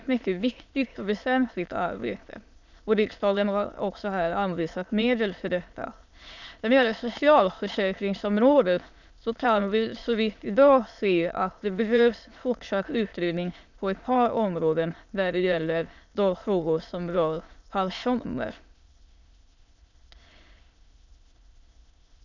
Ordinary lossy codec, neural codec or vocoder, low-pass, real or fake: none; autoencoder, 22.05 kHz, a latent of 192 numbers a frame, VITS, trained on many speakers; 7.2 kHz; fake